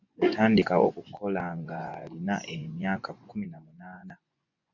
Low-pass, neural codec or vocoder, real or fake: 7.2 kHz; vocoder, 24 kHz, 100 mel bands, Vocos; fake